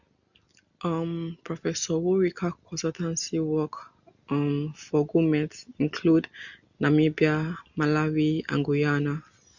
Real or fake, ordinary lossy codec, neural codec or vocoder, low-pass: real; none; none; 7.2 kHz